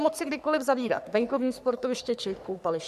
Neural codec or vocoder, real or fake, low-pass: codec, 44.1 kHz, 3.4 kbps, Pupu-Codec; fake; 14.4 kHz